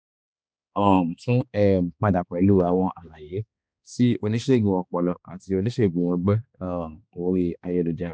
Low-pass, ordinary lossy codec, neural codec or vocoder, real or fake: none; none; codec, 16 kHz, 2 kbps, X-Codec, HuBERT features, trained on balanced general audio; fake